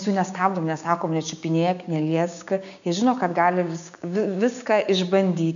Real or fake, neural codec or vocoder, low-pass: fake; codec, 16 kHz, 6 kbps, DAC; 7.2 kHz